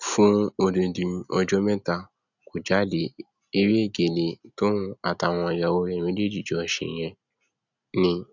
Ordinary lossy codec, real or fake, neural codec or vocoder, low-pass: none; real; none; 7.2 kHz